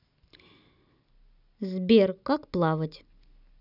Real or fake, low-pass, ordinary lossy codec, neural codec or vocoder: real; 5.4 kHz; none; none